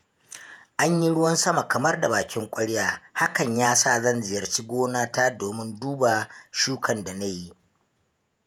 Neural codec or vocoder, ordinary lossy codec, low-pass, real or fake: vocoder, 48 kHz, 128 mel bands, Vocos; none; none; fake